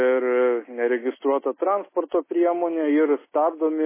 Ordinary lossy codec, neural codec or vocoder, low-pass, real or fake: MP3, 16 kbps; none; 3.6 kHz; real